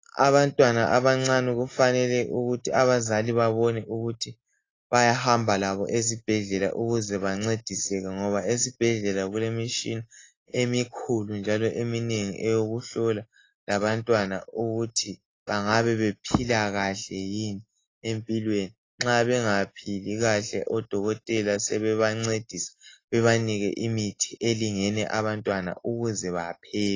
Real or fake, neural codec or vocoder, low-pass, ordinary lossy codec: real; none; 7.2 kHz; AAC, 32 kbps